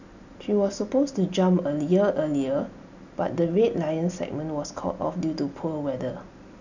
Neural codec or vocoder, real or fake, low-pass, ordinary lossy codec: none; real; 7.2 kHz; none